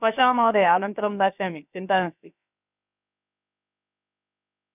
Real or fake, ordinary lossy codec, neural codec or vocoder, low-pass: fake; none; codec, 16 kHz, about 1 kbps, DyCAST, with the encoder's durations; 3.6 kHz